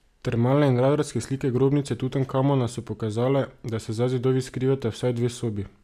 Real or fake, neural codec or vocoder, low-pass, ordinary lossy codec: real; none; 14.4 kHz; none